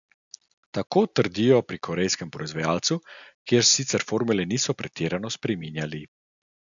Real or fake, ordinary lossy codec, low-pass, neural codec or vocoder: real; none; 7.2 kHz; none